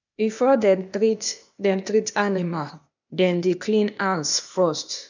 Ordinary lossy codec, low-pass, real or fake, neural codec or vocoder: none; 7.2 kHz; fake; codec, 16 kHz, 0.8 kbps, ZipCodec